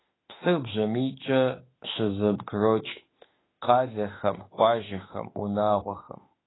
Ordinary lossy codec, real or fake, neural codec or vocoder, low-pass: AAC, 16 kbps; fake; autoencoder, 48 kHz, 32 numbers a frame, DAC-VAE, trained on Japanese speech; 7.2 kHz